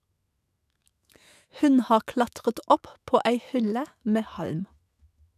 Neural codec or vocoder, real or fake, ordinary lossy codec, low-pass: autoencoder, 48 kHz, 128 numbers a frame, DAC-VAE, trained on Japanese speech; fake; none; 14.4 kHz